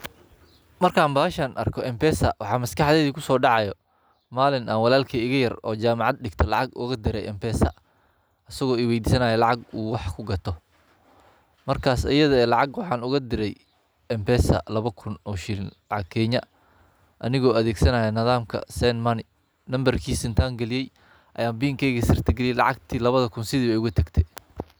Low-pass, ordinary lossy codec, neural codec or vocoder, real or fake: none; none; none; real